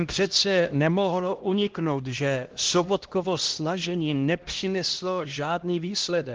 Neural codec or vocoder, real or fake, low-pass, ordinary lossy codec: codec, 16 kHz, 1 kbps, X-Codec, HuBERT features, trained on LibriSpeech; fake; 7.2 kHz; Opus, 16 kbps